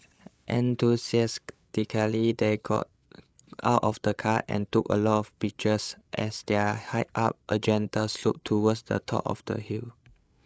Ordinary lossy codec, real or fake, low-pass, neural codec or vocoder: none; fake; none; codec, 16 kHz, 8 kbps, FreqCodec, larger model